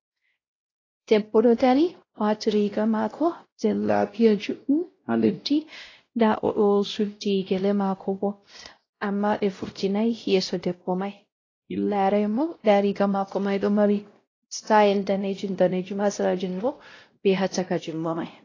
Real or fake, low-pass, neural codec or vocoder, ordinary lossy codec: fake; 7.2 kHz; codec, 16 kHz, 0.5 kbps, X-Codec, WavLM features, trained on Multilingual LibriSpeech; AAC, 32 kbps